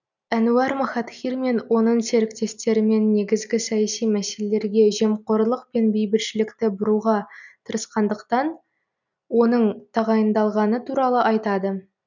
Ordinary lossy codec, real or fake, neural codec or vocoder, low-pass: none; real; none; 7.2 kHz